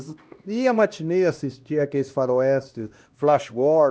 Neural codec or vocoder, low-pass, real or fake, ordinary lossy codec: codec, 16 kHz, 1 kbps, X-Codec, HuBERT features, trained on LibriSpeech; none; fake; none